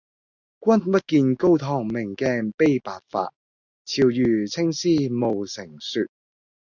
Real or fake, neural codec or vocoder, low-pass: real; none; 7.2 kHz